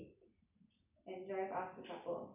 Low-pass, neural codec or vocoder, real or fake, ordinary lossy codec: 3.6 kHz; none; real; none